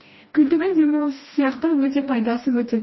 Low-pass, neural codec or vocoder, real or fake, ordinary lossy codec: 7.2 kHz; codec, 16 kHz, 1 kbps, FreqCodec, smaller model; fake; MP3, 24 kbps